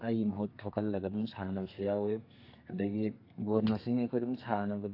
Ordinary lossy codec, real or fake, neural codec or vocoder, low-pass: AAC, 32 kbps; fake; codec, 32 kHz, 1.9 kbps, SNAC; 5.4 kHz